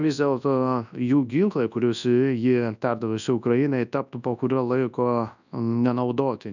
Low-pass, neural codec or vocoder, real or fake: 7.2 kHz; codec, 24 kHz, 0.9 kbps, WavTokenizer, large speech release; fake